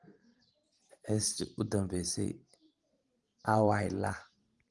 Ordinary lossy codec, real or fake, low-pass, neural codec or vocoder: Opus, 24 kbps; fake; 10.8 kHz; vocoder, 44.1 kHz, 128 mel bands every 512 samples, BigVGAN v2